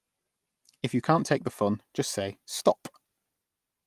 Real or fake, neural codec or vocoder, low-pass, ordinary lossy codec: real; none; 14.4 kHz; Opus, 32 kbps